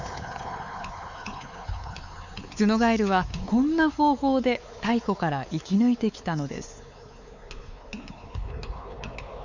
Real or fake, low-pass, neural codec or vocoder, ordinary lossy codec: fake; 7.2 kHz; codec, 16 kHz, 4 kbps, X-Codec, WavLM features, trained on Multilingual LibriSpeech; none